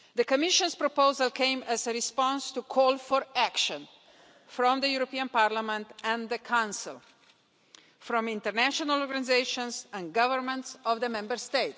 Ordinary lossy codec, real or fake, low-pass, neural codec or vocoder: none; real; none; none